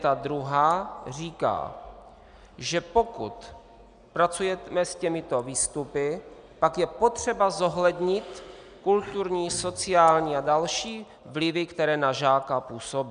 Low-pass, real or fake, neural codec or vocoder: 9.9 kHz; real; none